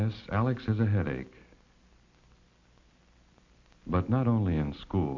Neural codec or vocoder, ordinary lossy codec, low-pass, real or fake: none; MP3, 48 kbps; 7.2 kHz; real